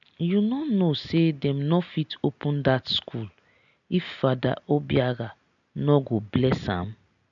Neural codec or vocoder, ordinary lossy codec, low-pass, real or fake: none; none; 7.2 kHz; real